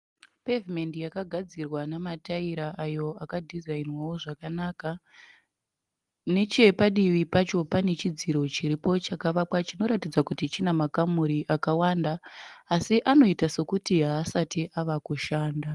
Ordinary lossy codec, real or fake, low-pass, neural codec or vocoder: Opus, 24 kbps; real; 10.8 kHz; none